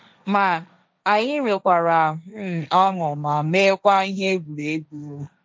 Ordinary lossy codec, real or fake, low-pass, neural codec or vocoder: none; fake; none; codec, 16 kHz, 1.1 kbps, Voila-Tokenizer